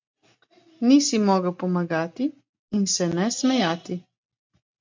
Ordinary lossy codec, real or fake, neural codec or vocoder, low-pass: MP3, 48 kbps; real; none; 7.2 kHz